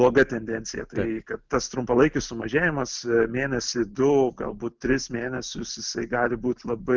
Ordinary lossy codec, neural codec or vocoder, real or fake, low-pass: Opus, 32 kbps; none; real; 7.2 kHz